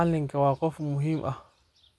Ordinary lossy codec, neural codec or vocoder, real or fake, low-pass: none; none; real; none